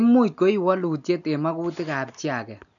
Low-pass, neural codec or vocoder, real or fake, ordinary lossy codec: 7.2 kHz; none; real; none